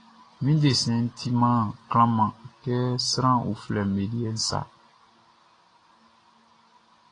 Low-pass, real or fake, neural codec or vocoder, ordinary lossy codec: 9.9 kHz; real; none; AAC, 32 kbps